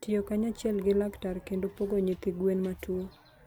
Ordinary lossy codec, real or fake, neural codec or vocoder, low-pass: none; real; none; none